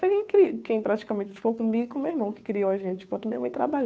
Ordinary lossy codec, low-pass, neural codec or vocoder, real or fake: none; none; codec, 16 kHz, 2 kbps, FunCodec, trained on Chinese and English, 25 frames a second; fake